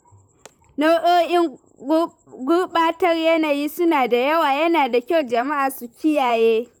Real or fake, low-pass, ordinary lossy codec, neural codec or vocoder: fake; 19.8 kHz; none; vocoder, 44.1 kHz, 128 mel bands, Pupu-Vocoder